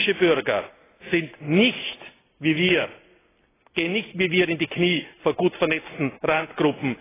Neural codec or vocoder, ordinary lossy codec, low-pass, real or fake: none; AAC, 16 kbps; 3.6 kHz; real